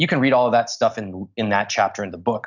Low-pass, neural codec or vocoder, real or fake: 7.2 kHz; none; real